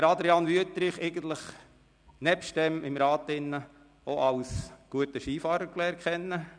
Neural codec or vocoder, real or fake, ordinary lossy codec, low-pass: none; real; none; 9.9 kHz